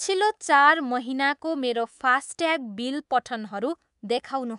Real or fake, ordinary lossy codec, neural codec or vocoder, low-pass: fake; none; codec, 24 kHz, 3.1 kbps, DualCodec; 10.8 kHz